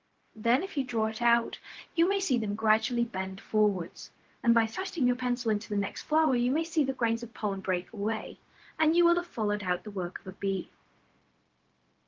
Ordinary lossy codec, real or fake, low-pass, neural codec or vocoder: Opus, 16 kbps; fake; 7.2 kHz; codec, 16 kHz, 0.4 kbps, LongCat-Audio-Codec